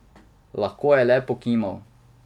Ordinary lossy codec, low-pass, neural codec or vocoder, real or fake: none; 19.8 kHz; vocoder, 44.1 kHz, 128 mel bands every 256 samples, BigVGAN v2; fake